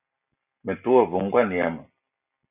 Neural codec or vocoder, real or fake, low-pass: none; real; 3.6 kHz